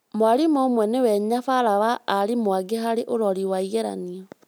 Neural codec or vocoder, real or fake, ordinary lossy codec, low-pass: none; real; none; none